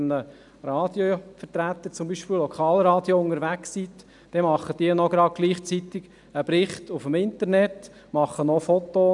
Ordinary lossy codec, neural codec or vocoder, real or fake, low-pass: none; none; real; 10.8 kHz